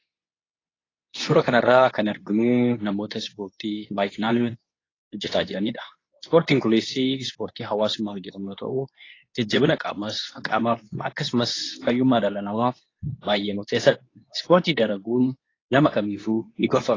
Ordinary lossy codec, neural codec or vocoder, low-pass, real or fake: AAC, 32 kbps; codec, 24 kHz, 0.9 kbps, WavTokenizer, medium speech release version 2; 7.2 kHz; fake